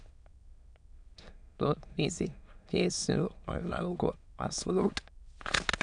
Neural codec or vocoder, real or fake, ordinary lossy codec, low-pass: autoencoder, 22.05 kHz, a latent of 192 numbers a frame, VITS, trained on many speakers; fake; none; 9.9 kHz